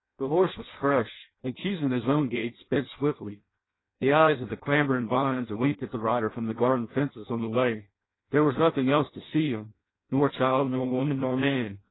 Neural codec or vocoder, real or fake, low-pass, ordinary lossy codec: codec, 16 kHz in and 24 kHz out, 0.6 kbps, FireRedTTS-2 codec; fake; 7.2 kHz; AAC, 16 kbps